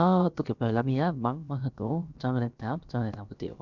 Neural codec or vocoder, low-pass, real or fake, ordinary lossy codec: codec, 16 kHz, about 1 kbps, DyCAST, with the encoder's durations; 7.2 kHz; fake; none